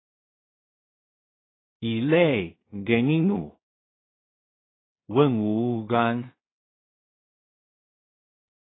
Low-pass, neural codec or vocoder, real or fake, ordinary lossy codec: 7.2 kHz; codec, 16 kHz in and 24 kHz out, 0.4 kbps, LongCat-Audio-Codec, two codebook decoder; fake; AAC, 16 kbps